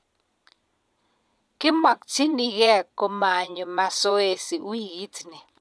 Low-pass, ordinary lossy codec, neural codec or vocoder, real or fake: none; none; vocoder, 22.05 kHz, 80 mel bands, Vocos; fake